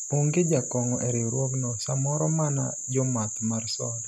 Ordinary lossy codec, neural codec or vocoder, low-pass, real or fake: none; none; 14.4 kHz; real